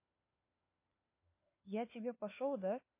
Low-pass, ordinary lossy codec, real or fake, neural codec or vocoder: 3.6 kHz; MP3, 24 kbps; fake; codec, 16 kHz, 4 kbps, FunCodec, trained on LibriTTS, 50 frames a second